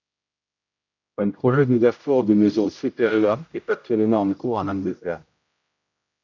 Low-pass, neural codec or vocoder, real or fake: 7.2 kHz; codec, 16 kHz, 0.5 kbps, X-Codec, HuBERT features, trained on general audio; fake